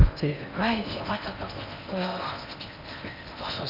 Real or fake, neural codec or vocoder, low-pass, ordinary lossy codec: fake; codec, 16 kHz in and 24 kHz out, 0.6 kbps, FocalCodec, streaming, 4096 codes; 5.4 kHz; none